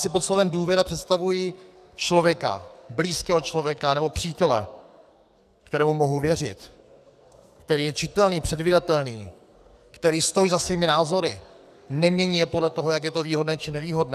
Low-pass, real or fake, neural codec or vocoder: 14.4 kHz; fake; codec, 44.1 kHz, 2.6 kbps, SNAC